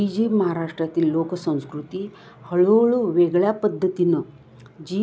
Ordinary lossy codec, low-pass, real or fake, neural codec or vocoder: none; none; real; none